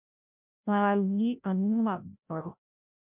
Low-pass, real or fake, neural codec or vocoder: 3.6 kHz; fake; codec, 16 kHz, 0.5 kbps, FreqCodec, larger model